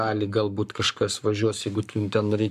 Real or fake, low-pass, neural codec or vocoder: fake; 14.4 kHz; codec, 44.1 kHz, 7.8 kbps, Pupu-Codec